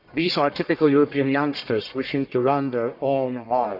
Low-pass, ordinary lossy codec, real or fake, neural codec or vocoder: 5.4 kHz; none; fake; codec, 44.1 kHz, 1.7 kbps, Pupu-Codec